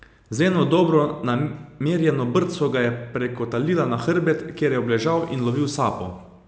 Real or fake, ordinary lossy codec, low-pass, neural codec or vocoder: real; none; none; none